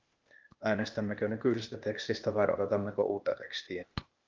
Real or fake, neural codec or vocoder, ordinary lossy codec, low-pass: fake; codec, 16 kHz, 0.8 kbps, ZipCodec; Opus, 24 kbps; 7.2 kHz